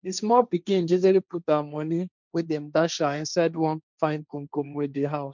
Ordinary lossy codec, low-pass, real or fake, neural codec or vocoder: none; 7.2 kHz; fake; codec, 16 kHz, 1.1 kbps, Voila-Tokenizer